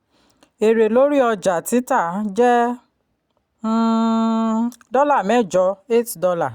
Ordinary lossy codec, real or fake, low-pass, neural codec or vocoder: Opus, 64 kbps; real; 19.8 kHz; none